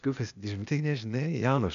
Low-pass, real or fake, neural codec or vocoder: 7.2 kHz; fake; codec, 16 kHz, 0.8 kbps, ZipCodec